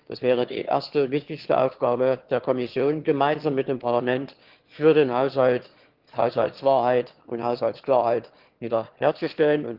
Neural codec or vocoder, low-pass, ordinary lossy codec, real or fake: autoencoder, 22.05 kHz, a latent of 192 numbers a frame, VITS, trained on one speaker; 5.4 kHz; Opus, 16 kbps; fake